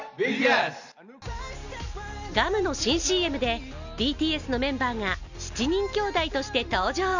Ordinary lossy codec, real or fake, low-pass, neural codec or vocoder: none; real; 7.2 kHz; none